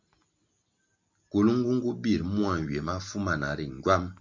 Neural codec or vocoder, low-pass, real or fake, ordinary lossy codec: none; 7.2 kHz; real; MP3, 64 kbps